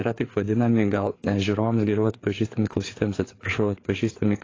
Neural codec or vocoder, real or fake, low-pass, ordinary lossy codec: codec, 16 kHz, 4 kbps, FreqCodec, larger model; fake; 7.2 kHz; AAC, 32 kbps